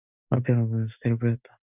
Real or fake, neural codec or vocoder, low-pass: real; none; 3.6 kHz